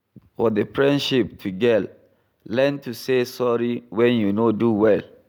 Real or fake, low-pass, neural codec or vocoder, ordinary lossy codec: real; none; none; none